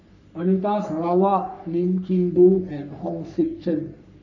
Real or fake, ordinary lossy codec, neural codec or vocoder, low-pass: fake; none; codec, 44.1 kHz, 3.4 kbps, Pupu-Codec; 7.2 kHz